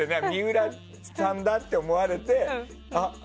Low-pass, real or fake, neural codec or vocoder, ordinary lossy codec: none; real; none; none